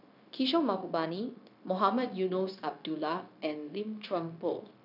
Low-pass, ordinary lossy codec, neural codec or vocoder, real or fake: 5.4 kHz; none; codec, 16 kHz, 0.9 kbps, LongCat-Audio-Codec; fake